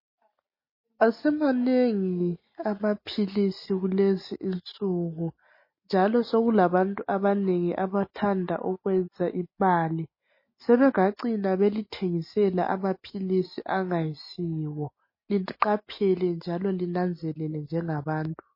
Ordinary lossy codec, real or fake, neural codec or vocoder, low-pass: MP3, 24 kbps; real; none; 5.4 kHz